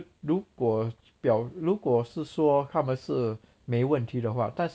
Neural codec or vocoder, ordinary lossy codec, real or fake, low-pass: none; none; real; none